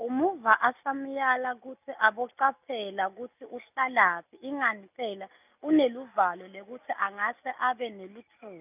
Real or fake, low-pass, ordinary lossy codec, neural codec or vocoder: real; 3.6 kHz; none; none